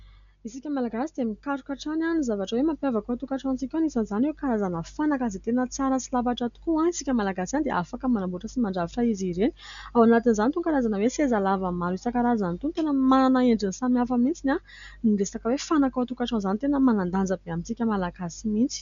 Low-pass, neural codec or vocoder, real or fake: 7.2 kHz; none; real